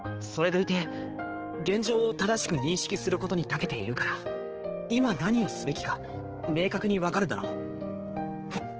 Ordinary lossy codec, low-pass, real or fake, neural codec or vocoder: Opus, 16 kbps; 7.2 kHz; fake; codec, 16 kHz, 4 kbps, X-Codec, HuBERT features, trained on balanced general audio